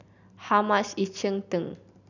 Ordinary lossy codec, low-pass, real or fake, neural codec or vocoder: none; 7.2 kHz; real; none